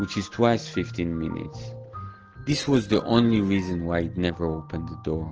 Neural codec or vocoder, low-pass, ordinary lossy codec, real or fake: none; 7.2 kHz; Opus, 16 kbps; real